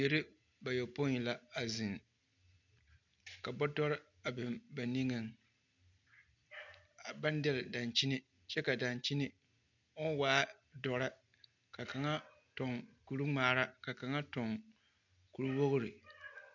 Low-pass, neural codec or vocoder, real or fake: 7.2 kHz; none; real